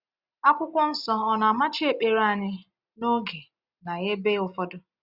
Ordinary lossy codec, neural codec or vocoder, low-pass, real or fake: Opus, 64 kbps; none; 5.4 kHz; real